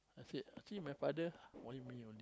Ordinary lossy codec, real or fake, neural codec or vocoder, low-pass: none; real; none; none